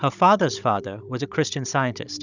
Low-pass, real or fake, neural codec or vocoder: 7.2 kHz; fake; codec, 16 kHz, 16 kbps, FunCodec, trained on Chinese and English, 50 frames a second